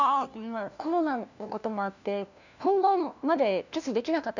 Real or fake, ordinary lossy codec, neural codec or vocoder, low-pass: fake; none; codec, 16 kHz, 1 kbps, FunCodec, trained on LibriTTS, 50 frames a second; 7.2 kHz